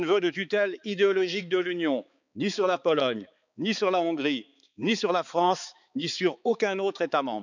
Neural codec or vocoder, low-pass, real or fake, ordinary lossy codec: codec, 16 kHz, 4 kbps, X-Codec, HuBERT features, trained on balanced general audio; 7.2 kHz; fake; none